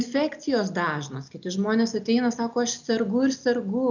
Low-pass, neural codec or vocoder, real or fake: 7.2 kHz; none; real